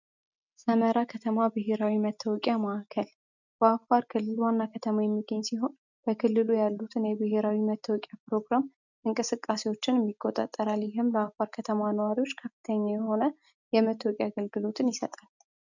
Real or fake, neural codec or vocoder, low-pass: real; none; 7.2 kHz